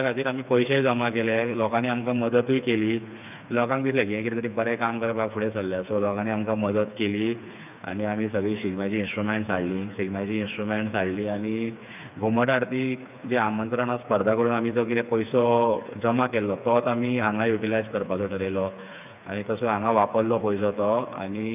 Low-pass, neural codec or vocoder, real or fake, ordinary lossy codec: 3.6 kHz; codec, 16 kHz, 4 kbps, FreqCodec, smaller model; fake; none